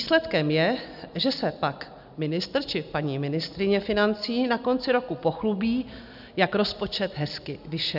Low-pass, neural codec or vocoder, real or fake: 5.4 kHz; none; real